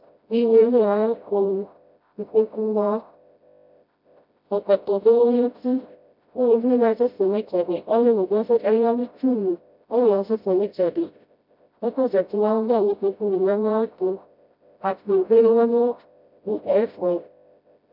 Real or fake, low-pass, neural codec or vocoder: fake; 5.4 kHz; codec, 16 kHz, 0.5 kbps, FreqCodec, smaller model